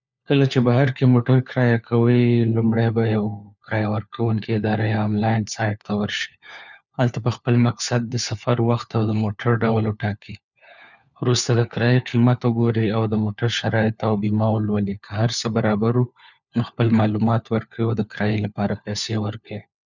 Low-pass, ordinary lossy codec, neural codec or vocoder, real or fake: 7.2 kHz; none; codec, 16 kHz, 4 kbps, FunCodec, trained on LibriTTS, 50 frames a second; fake